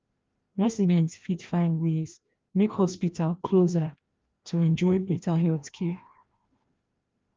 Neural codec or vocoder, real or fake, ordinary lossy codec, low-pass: codec, 16 kHz, 1 kbps, FreqCodec, larger model; fake; Opus, 24 kbps; 7.2 kHz